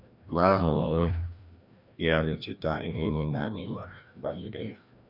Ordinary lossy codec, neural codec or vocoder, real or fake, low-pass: none; codec, 16 kHz, 1 kbps, FreqCodec, larger model; fake; 5.4 kHz